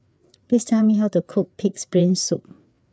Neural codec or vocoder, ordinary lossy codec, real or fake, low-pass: codec, 16 kHz, 4 kbps, FreqCodec, larger model; none; fake; none